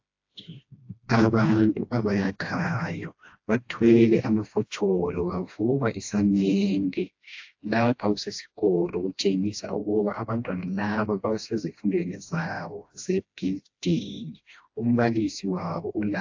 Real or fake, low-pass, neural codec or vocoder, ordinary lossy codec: fake; 7.2 kHz; codec, 16 kHz, 1 kbps, FreqCodec, smaller model; AAC, 48 kbps